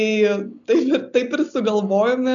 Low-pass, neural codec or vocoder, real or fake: 7.2 kHz; none; real